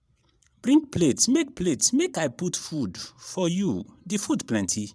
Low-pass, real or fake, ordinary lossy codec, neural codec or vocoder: none; fake; none; vocoder, 22.05 kHz, 80 mel bands, Vocos